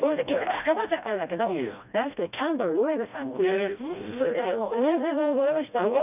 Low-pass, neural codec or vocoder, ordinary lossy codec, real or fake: 3.6 kHz; codec, 16 kHz, 1 kbps, FreqCodec, smaller model; none; fake